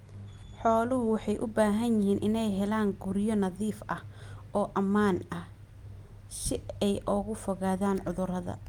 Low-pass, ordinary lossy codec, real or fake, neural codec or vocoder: 19.8 kHz; Opus, 32 kbps; real; none